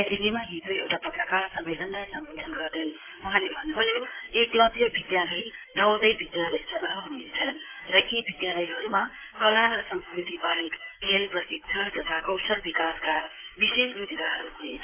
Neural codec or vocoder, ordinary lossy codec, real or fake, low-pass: codec, 16 kHz in and 24 kHz out, 2.2 kbps, FireRedTTS-2 codec; AAC, 24 kbps; fake; 3.6 kHz